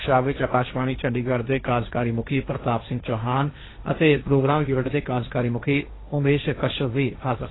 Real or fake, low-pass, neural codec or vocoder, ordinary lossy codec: fake; 7.2 kHz; codec, 16 kHz, 1.1 kbps, Voila-Tokenizer; AAC, 16 kbps